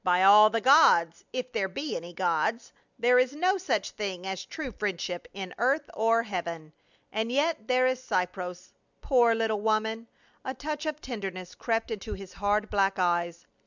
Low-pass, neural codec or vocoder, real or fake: 7.2 kHz; none; real